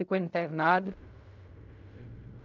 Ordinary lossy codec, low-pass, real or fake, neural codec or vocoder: none; 7.2 kHz; fake; codec, 16 kHz in and 24 kHz out, 0.4 kbps, LongCat-Audio-Codec, fine tuned four codebook decoder